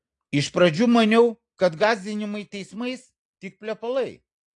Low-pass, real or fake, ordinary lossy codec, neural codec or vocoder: 10.8 kHz; real; AAC, 48 kbps; none